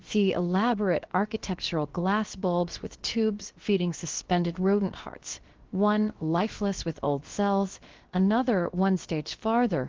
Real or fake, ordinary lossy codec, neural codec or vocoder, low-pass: fake; Opus, 16 kbps; codec, 16 kHz, about 1 kbps, DyCAST, with the encoder's durations; 7.2 kHz